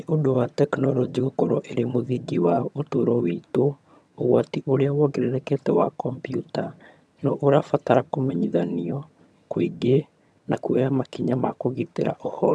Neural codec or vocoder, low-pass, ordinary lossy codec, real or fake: vocoder, 22.05 kHz, 80 mel bands, HiFi-GAN; none; none; fake